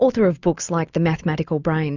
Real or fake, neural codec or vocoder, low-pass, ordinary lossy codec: real; none; 7.2 kHz; Opus, 64 kbps